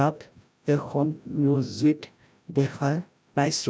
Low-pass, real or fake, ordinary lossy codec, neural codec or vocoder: none; fake; none; codec, 16 kHz, 0.5 kbps, FreqCodec, larger model